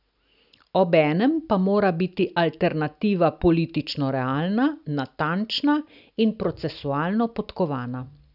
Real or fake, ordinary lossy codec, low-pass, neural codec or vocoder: real; none; 5.4 kHz; none